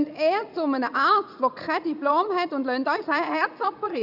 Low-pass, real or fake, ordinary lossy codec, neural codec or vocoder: 5.4 kHz; fake; Opus, 64 kbps; vocoder, 44.1 kHz, 80 mel bands, Vocos